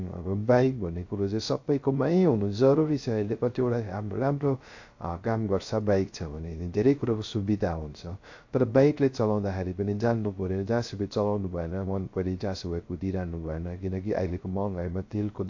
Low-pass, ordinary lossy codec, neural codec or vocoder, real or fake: 7.2 kHz; AAC, 48 kbps; codec, 16 kHz, 0.3 kbps, FocalCodec; fake